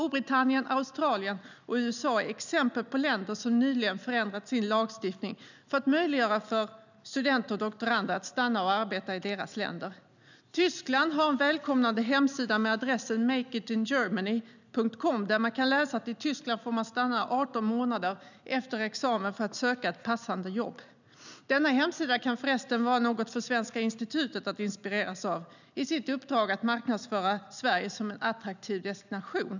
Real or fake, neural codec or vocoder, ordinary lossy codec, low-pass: real; none; none; 7.2 kHz